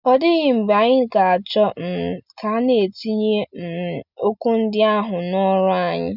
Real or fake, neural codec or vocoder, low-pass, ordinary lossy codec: real; none; 5.4 kHz; none